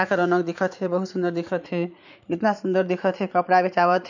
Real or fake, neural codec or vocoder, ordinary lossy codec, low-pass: fake; vocoder, 44.1 kHz, 80 mel bands, Vocos; none; 7.2 kHz